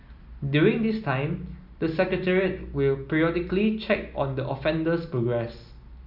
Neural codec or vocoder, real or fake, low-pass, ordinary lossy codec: none; real; 5.4 kHz; none